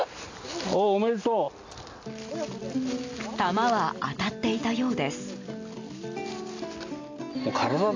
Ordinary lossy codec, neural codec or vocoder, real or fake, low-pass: AAC, 48 kbps; none; real; 7.2 kHz